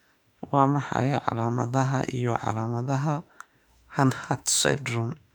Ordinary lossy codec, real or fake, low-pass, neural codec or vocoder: none; fake; 19.8 kHz; autoencoder, 48 kHz, 32 numbers a frame, DAC-VAE, trained on Japanese speech